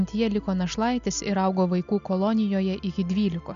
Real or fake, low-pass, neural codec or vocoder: real; 7.2 kHz; none